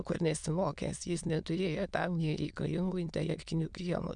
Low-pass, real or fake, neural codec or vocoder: 9.9 kHz; fake; autoencoder, 22.05 kHz, a latent of 192 numbers a frame, VITS, trained on many speakers